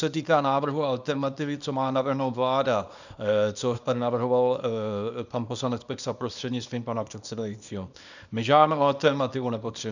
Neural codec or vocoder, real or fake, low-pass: codec, 24 kHz, 0.9 kbps, WavTokenizer, small release; fake; 7.2 kHz